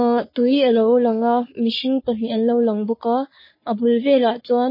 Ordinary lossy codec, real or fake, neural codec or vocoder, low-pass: MP3, 24 kbps; fake; codec, 44.1 kHz, 3.4 kbps, Pupu-Codec; 5.4 kHz